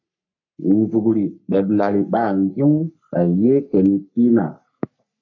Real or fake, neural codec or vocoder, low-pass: fake; codec, 44.1 kHz, 3.4 kbps, Pupu-Codec; 7.2 kHz